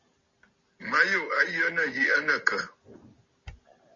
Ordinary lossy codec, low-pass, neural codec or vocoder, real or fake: MP3, 32 kbps; 7.2 kHz; none; real